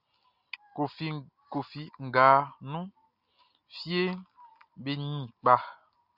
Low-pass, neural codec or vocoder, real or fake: 5.4 kHz; none; real